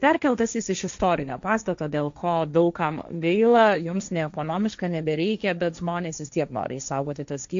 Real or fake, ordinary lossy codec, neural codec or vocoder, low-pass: fake; AAC, 64 kbps; codec, 16 kHz, 1.1 kbps, Voila-Tokenizer; 7.2 kHz